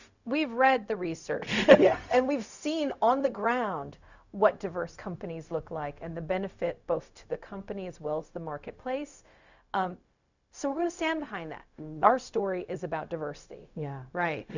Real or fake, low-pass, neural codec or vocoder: fake; 7.2 kHz; codec, 16 kHz, 0.4 kbps, LongCat-Audio-Codec